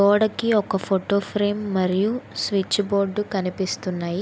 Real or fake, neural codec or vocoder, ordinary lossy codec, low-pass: real; none; none; none